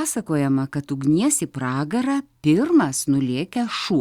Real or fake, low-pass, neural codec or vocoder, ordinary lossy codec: real; 19.8 kHz; none; Opus, 64 kbps